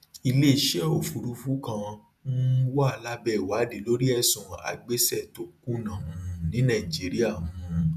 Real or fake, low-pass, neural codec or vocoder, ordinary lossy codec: fake; 14.4 kHz; vocoder, 48 kHz, 128 mel bands, Vocos; none